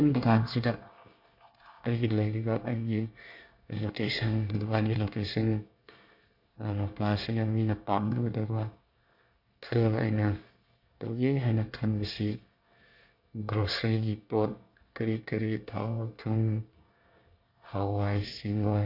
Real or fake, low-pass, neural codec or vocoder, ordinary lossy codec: fake; 5.4 kHz; codec, 24 kHz, 1 kbps, SNAC; none